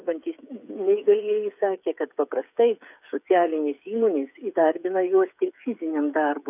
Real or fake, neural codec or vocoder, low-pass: fake; codec, 16 kHz, 8 kbps, FreqCodec, smaller model; 3.6 kHz